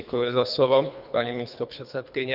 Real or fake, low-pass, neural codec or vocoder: fake; 5.4 kHz; codec, 24 kHz, 3 kbps, HILCodec